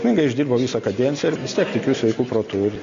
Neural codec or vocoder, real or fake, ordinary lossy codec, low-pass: none; real; MP3, 64 kbps; 7.2 kHz